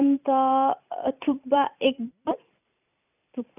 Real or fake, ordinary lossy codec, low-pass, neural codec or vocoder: fake; none; 3.6 kHz; vocoder, 44.1 kHz, 128 mel bands every 256 samples, BigVGAN v2